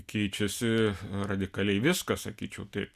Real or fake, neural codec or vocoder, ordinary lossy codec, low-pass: real; none; Opus, 64 kbps; 14.4 kHz